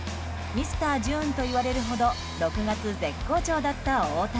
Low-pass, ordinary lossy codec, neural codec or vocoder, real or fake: none; none; none; real